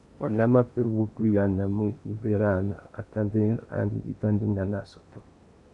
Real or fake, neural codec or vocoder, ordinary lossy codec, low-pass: fake; codec, 16 kHz in and 24 kHz out, 0.8 kbps, FocalCodec, streaming, 65536 codes; MP3, 96 kbps; 10.8 kHz